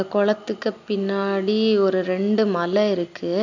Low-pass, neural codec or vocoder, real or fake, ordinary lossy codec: 7.2 kHz; none; real; none